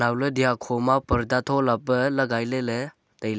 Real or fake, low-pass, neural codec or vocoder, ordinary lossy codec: real; none; none; none